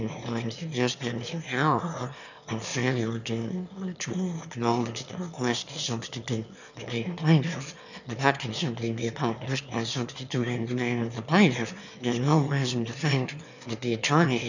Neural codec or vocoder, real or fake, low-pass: autoencoder, 22.05 kHz, a latent of 192 numbers a frame, VITS, trained on one speaker; fake; 7.2 kHz